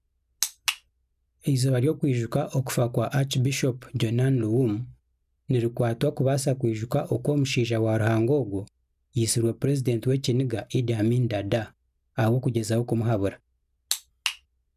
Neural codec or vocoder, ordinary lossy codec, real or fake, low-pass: none; none; real; 14.4 kHz